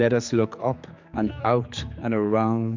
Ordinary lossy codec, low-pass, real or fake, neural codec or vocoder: MP3, 64 kbps; 7.2 kHz; fake; codec, 16 kHz, 4 kbps, X-Codec, HuBERT features, trained on general audio